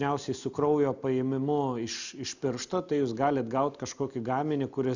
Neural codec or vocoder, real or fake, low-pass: none; real; 7.2 kHz